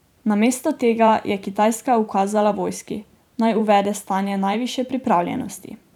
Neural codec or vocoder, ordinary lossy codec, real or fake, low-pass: vocoder, 44.1 kHz, 128 mel bands every 512 samples, BigVGAN v2; none; fake; 19.8 kHz